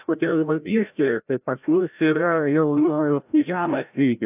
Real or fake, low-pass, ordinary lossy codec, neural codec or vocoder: fake; 3.6 kHz; AAC, 32 kbps; codec, 16 kHz, 0.5 kbps, FreqCodec, larger model